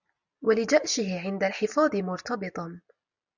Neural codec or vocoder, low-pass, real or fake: none; 7.2 kHz; real